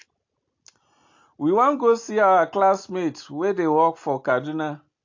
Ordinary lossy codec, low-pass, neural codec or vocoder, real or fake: AAC, 48 kbps; 7.2 kHz; none; real